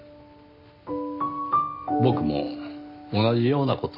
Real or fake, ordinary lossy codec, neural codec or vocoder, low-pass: real; AAC, 48 kbps; none; 5.4 kHz